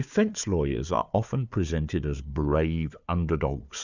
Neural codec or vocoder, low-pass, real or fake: codec, 16 kHz, 4 kbps, FunCodec, trained on Chinese and English, 50 frames a second; 7.2 kHz; fake